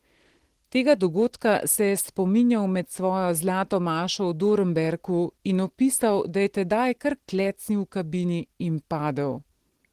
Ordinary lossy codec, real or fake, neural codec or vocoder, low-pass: Opus, 16 kbps; real; none; 14.4 kHz